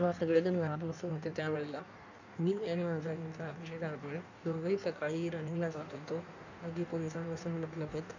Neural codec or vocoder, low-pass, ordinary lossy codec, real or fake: codec, 16 kHz in and 24 kHz out, 1.1 kbps, FireRedTTS-2 codec; 7.2 kHz; none; fake